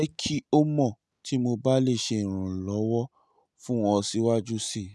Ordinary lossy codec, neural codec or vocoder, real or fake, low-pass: none; none; real; none